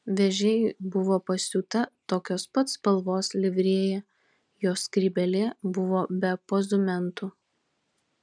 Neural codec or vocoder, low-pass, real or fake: none; 9.9 kHz; real